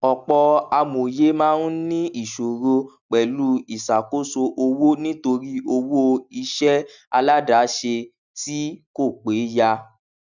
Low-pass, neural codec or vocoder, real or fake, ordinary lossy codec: 7.2 kHz; none; real; none